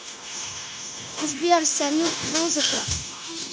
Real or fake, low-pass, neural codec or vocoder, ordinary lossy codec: fake; none; codec, 16 kHz, 0.9 kbps, LongCat-Audio-Codec; none